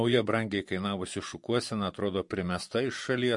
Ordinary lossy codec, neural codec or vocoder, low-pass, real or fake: MP3, 48 kbps; vocoder, 24 kHz, 100 mel bands, Vocos; 10.8 kHz; fake